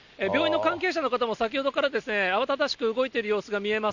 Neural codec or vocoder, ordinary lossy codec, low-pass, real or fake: none; none; 7.2 kHz; real